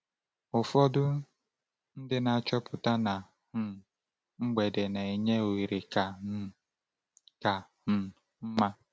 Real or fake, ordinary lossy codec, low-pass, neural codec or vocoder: real; none; none; none